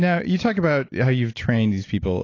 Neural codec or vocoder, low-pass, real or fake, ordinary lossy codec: none; 7.2 kHz; real; AAC, 32 kbps